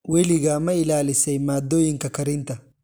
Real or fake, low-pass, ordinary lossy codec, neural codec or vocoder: real; none; none; none